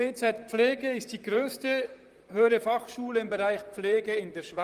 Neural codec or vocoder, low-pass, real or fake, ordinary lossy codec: vocoder, 44.1 kHz, 128 mel bands, Pupu-Vocoder; 14.4 kHz; fake; Opus, 32 kbps